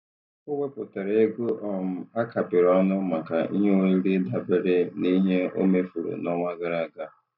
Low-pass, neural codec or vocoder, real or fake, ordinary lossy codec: 5.4 kHz; none; real; none